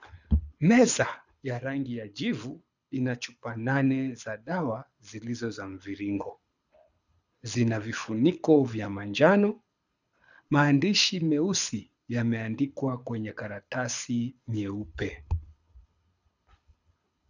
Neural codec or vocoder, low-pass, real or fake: codec, 24 kHz, 6 kbps, HILCodec; 7.2 kHz; fake